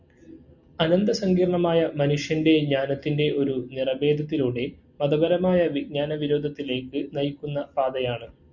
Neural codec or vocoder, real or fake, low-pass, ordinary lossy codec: none; real; 7.2 kHz; Opus, 64 kbps